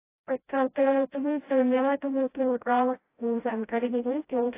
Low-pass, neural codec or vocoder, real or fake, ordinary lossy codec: 3.6 kHz; codec, 16 kHz, 0.5 kbps, FreqCodec, smaller model; fake; AAC, 24 kbps